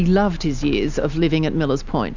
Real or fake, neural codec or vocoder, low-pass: real; none; 7.2 kHz